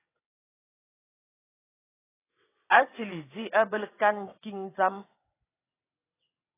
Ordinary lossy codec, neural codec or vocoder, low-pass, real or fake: AAC, 16 kbps; vocoder, 22.05 kHz, 80 mel bands, WaveNeXt; 3.6 kHz; fake